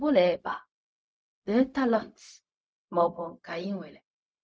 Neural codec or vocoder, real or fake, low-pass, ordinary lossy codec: codec, 16 kHz, 0.4 kbps, LongCat-Audio-Codec; fake; none; none